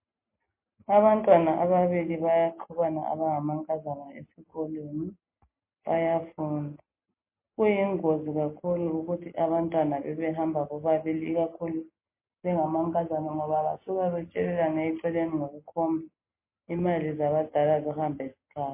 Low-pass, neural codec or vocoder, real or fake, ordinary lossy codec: 3.6 kHz; none; real; MP3, 24 kbps